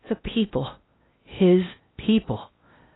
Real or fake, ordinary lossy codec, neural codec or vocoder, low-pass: fake; AAC, 16 kbps; codec, 16 kHz in and 24 kHz out, 0.6 kbps, FocalCodec, streaming, 4096 codes; 7.2 kHz